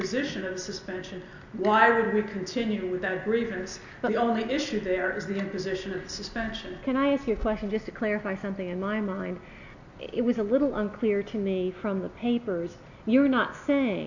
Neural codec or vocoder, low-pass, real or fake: none; 7.2 kHz; real